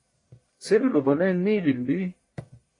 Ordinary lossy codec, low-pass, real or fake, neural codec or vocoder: AAC, 32 kbps; 10.8 kHz; fake; codec, 44.1 kHz, 1.7 kbps, Pupu-Codec